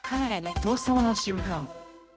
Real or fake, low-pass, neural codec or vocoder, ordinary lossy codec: fake; none; codec, 16 kHz, 0.5 kbps, X-Codec, HuBERT features, trained on general audio; none